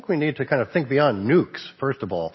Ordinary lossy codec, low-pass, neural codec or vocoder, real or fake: MP3, 24 kbps; 7.2 kHz; none; real